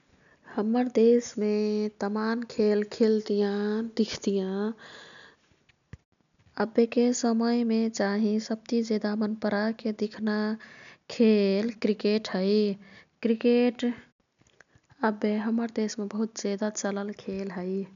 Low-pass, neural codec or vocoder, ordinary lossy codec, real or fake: 7.2 kHz; none; none; real